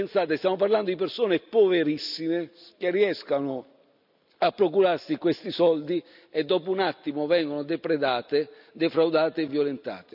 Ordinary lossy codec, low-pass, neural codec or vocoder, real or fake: none; 5.4 kHz; none; real